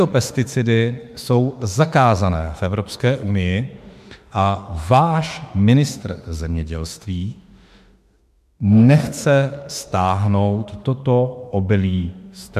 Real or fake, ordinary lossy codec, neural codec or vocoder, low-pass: fake; MP3, 96 kbps; autoencoder, 48 kHz, 32 numbers a frame, DAC-VAE, trained on Japanese speech; 14.4 kHz